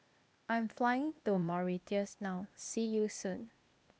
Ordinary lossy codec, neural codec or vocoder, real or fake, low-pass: none; codec, 16 kHz, 0.8 kbps, ZipCodec; fake; none